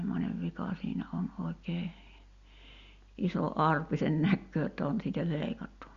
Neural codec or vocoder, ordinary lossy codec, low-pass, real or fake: none; Opus, 64 kbps; 7.2 kHz; real